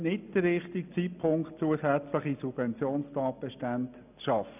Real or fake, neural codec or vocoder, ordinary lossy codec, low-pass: real; none; none; 3.6 kHz